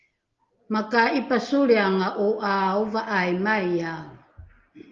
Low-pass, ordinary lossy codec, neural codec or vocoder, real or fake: 7.2 kHz; Opus, 32 kbps; none; real